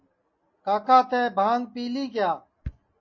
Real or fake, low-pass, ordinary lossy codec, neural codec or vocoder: real; 7.2 kHz; MP3, 32 kbps; none